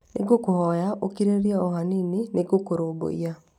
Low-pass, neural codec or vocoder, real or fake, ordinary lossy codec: 19.8 kHz; none; real; none